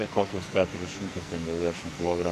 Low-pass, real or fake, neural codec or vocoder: 14.4 kHz; fake; codec, 44.1 kHz, 2.6 kbps, SNAC